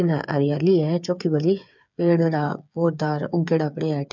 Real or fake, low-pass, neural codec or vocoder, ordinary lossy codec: fake; 7.2 kHz; codec, 16 kHz, 8 kbps, FreqCodec, smaller model; none